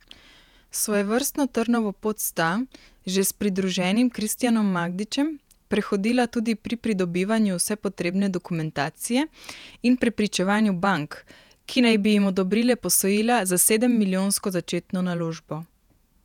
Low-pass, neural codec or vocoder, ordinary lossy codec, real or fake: 19.8 kHz; vocoder, 48 kHz, 128 mel bands, Vocos; none; fake